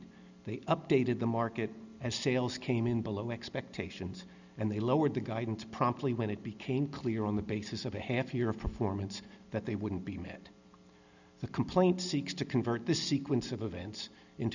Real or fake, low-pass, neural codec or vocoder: real; 7.2 kHz; none